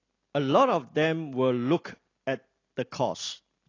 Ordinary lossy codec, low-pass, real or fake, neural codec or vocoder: AAC, 32 kbps; 7.2 kHz; real; none